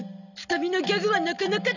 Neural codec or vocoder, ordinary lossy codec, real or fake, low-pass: none; none; real; 7.2 kHz